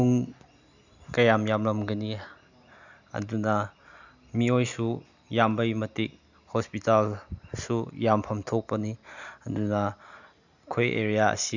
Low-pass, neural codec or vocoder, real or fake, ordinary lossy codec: 7.2 kHz; none; real; none